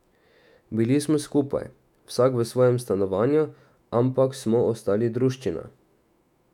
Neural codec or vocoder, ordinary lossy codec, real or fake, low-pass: autoencoder, 48 kHz, 128 numbers a frame, DAC-VAE, trained on Japanese speech; none; fake; 19.8 kHz